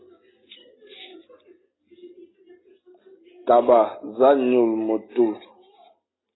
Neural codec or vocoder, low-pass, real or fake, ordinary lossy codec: none; 7.2 kHz; real; AAC, 16 kbps